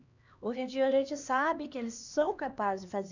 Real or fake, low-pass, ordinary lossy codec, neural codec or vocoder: fake; 7.2 kHz; none; codec, 16 kHz, 2 kbps, X-Codec, HuBERT features, trained on LibriSpeech